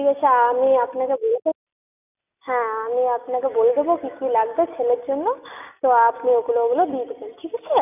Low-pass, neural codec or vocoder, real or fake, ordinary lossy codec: 3.6 kHz; none; real; none